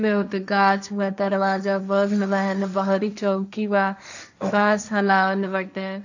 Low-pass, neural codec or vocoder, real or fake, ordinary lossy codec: 7.2 kHz; codec, 16 kHz, 1.1 kbps, Voila-Tokenizer; fake; none